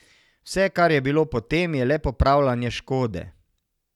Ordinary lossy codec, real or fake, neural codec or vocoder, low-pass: none; real; none; 19.8 kHz